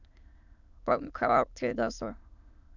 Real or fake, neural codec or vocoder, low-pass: fake; autoencoder, 22.05 kHz, a latent of 192 numbers a frame, VITS, trained on many speakers; 7.2 kHz